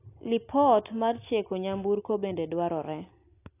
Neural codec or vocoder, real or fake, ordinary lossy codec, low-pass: none; real; AAC, 24 kbps; 3.6 kHz